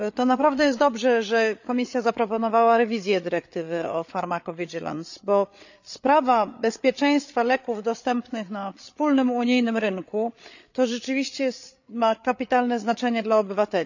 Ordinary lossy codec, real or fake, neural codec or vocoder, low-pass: none; fake; codec, 16 kHz, 8 kbps, FreqCodec, larger model; 7.2 kHz